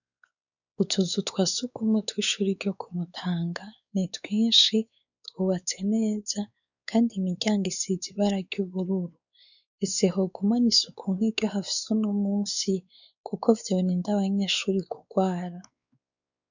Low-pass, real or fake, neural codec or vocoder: 7.2 kHz; fake; codec, 16 kHz, 4 kbps, X-Codec, WavLM features, trained on Multilingual LibriSpeech